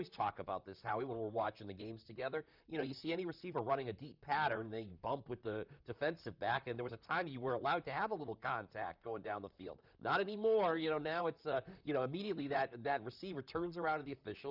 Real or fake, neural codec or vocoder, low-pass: fake; vocoder, 44.1 kHz, 128 mel bands, Pupu-Vocoder; 5.4 kHz